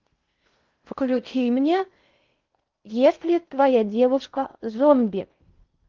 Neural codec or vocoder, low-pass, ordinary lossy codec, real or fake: codec, 16 kHz in and 24 kHz out, 0.6 kbps, FocalCodec, streaming, 2048 codes; 7.2 kHz; Opus, 32 kbps; fake